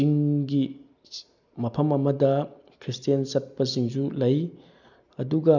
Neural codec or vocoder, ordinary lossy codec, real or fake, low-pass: none; none; real; 7.2 kHz